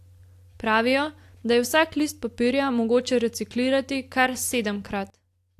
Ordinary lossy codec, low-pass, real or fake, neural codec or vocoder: AAC, 64 kbps; 14.4 kHz; real; none